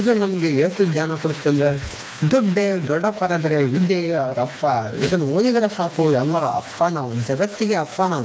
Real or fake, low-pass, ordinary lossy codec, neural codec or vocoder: fake; none; none; codec, 16 kHz, 2 kbps, FreqCodec, smaller model